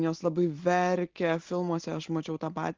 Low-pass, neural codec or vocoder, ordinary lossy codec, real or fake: 7.2 kHz; none; Opus, 16 kbps; real